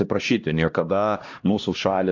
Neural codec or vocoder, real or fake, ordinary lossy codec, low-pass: codec, 16 kHz, 1 kbps, X-Codec, HuBERT features, trained on LibriSpeech; fake; AAC, 48 kbps; 7.2 kHz